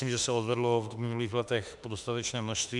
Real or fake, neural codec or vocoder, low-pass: fake; autoencoder, 48 kHz, 32 numbers a frame, DAC-VAE, trained on Japanese speech; 10.8 kHz